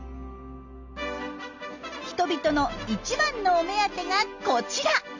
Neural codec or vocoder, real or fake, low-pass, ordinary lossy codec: none; real; 7.2 kHz; none